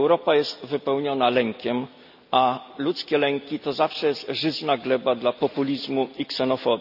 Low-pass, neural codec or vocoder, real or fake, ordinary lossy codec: 5.4 kHz; none; real; none